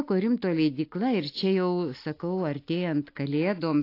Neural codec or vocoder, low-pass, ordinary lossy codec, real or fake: none; 5.4 kHz; AAC, 32 kbps; real